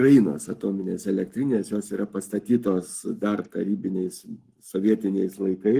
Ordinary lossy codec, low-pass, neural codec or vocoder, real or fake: Opus, 24 kbps; 14.4 kHz; codec, 44.1 kHz, 7.8 kbps, Pupu-Codec; fake